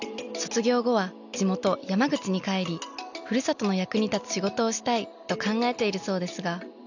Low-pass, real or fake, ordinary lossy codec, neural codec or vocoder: 7.2 kHz; real; none; none